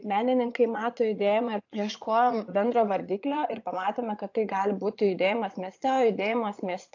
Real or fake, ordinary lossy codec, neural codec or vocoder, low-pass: fake; AAC, 48 kbps; vocoder, 22.05 kHz, 80 mel bands, Vocos; 7.2 kHz